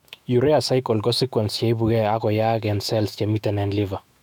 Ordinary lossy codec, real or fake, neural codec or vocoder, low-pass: none; fake; autoencoder, 48 kHz, 128 numbers a frame, DAC-VAE, trained on Japanese speech; 19.8 kHz